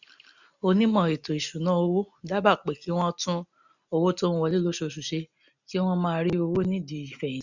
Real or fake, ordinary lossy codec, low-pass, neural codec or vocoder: real; none; 7.2 kHz; none